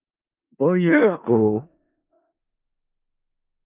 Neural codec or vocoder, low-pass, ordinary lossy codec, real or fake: codec, 16 kHz in and 24 kHz out, 0.4 kbps, LongCat-Audio-Codec, four codebook decoder; 3.6 kHz; Opus, 24 kbps; fake